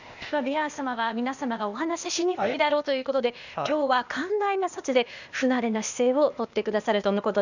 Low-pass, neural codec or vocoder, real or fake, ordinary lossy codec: 7.2 kHz; codec, 16 kHz, 0.8 kbps, ZipCodec; fake; none